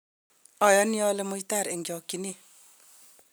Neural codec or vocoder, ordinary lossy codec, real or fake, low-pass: none; none; real; none